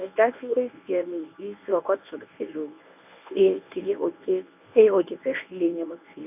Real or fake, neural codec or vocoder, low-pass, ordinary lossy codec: fake; codec, 24 kHz, 0.9 kbps, WavTokenizer, medium speech release version 1; 3.6 kHz; none